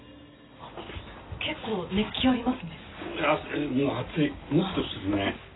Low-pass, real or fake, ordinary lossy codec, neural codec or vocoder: 7.2 kHz; real; AAC, 16 kbps; none